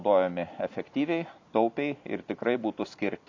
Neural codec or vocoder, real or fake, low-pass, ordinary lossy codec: vocoder, 44.1 kHz, 128 mel bands every 256 samples, BigVGAN v2; fake; 7.2 kHz; MP3, 64 kbps